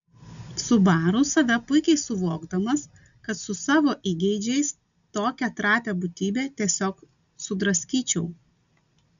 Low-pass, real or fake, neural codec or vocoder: 7.2 kHz; real; none